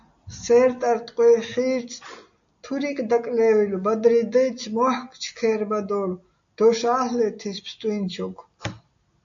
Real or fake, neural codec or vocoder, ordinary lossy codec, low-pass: real; none; AAC, 64 kbps; 7.2 kHz